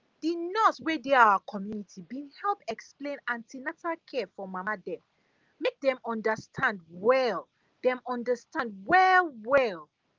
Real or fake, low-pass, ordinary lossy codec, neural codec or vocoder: real; 7.2 kHz; Opus, 32 kbps; none